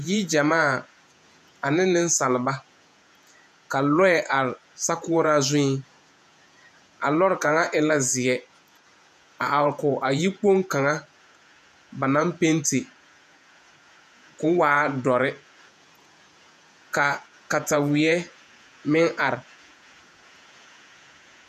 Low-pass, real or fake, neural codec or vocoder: 14.4 kHz; fake; vocoder, 48 kHz, 128 mel bands, Vocos